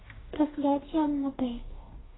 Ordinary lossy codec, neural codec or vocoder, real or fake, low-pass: AAC, 16 kbps; codec, 44.1 kHz, 2.6 kbps, DAC; fake; 7.2 kHz